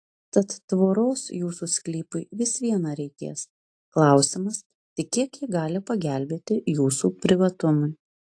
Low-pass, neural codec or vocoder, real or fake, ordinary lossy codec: 9.9 kHz; none; real; AAC, 48 kbps